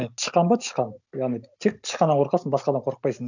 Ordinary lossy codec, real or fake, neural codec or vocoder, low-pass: none; real; none; 7.2 kHz